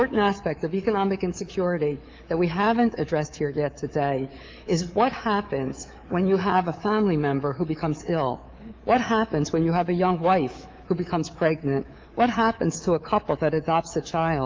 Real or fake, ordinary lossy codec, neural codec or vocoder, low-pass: fake; Opus, 32 kbps; codec, 24 kHz, 3.1 kbps, DualCodec; 7.2 kHz